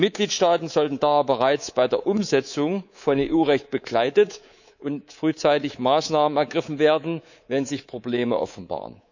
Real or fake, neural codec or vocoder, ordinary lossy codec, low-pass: fake; codec, 24 kHz, 3.1 kbps, DualCodec; none; 7.2 kHz